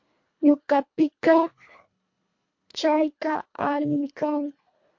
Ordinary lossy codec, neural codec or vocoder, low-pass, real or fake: MP3, 48 kbps; codec, 24 kHz, 1.5 kbps, HILCodec; 7.2 kHz; fake